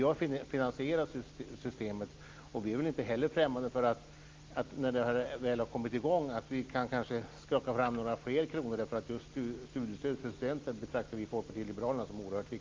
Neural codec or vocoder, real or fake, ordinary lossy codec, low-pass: none; real; Opus, 32 kbps; 7.2 kHz